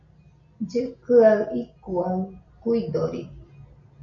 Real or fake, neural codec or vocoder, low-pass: real; none; 7.2 kHz